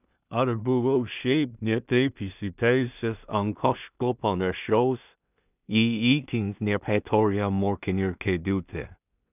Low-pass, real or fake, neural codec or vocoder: 3.6 kHz; fake; codec, 16 kHz in and 24 kHz out, 0.4 kbps, LongCat-Audio-Codec, two codebook decoder